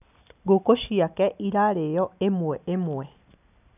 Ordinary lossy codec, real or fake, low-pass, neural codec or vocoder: none; real; 3.6 kHz; none